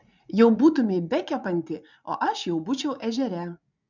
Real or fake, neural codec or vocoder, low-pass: fake; vocoder, 44.1 kHz, 80 mel bands, Vocos; 7.2 kHz